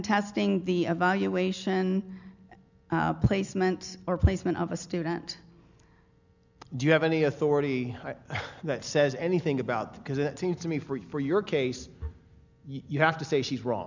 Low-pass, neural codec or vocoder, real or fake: 7.2 kHz; none; real